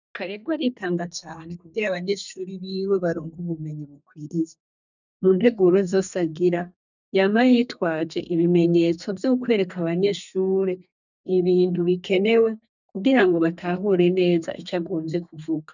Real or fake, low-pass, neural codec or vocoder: fake; 7.2 kHz; codec, 32 kHz, 1.9 kbps, SNAC